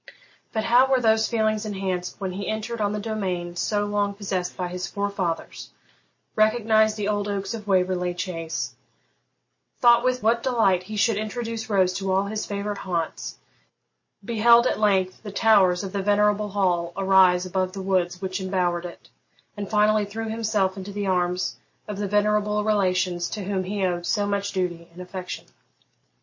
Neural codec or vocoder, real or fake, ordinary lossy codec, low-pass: none; real; MP3, 32 kbps; 7.2 kHz